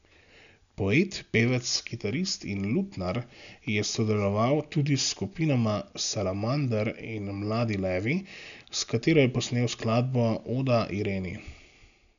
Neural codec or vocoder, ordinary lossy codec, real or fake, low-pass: none; none; real; 7.2 kHz